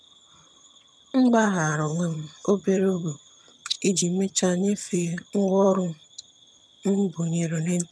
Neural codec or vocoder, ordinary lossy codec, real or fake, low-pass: vocoder, 22.05 kHz, 80 mel bands, HiFi-GAN; none; fake; none